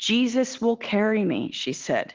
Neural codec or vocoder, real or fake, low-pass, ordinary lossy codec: none; real; 7.2 kHz; Opus, 16 kbps